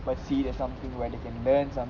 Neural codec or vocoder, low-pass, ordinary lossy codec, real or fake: none; 7.2 kHz; Opus, 32 kbps; real